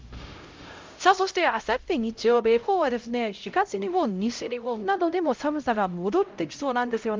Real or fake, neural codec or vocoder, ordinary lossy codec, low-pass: fake; codec, 16 kHz, 0.5 kbps, X-Codec, HuBERT features, trained on LibriSpeech; Opus, 32 kbps; 7.2 kHz